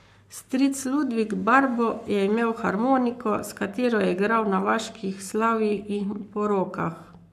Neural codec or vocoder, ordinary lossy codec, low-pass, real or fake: codec, 44.1 kHz, 7.8 kbps, Pupu-Codec; none; 14.4 kHz; fake